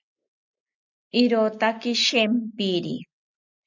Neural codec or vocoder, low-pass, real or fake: none; 7.2 kHz; real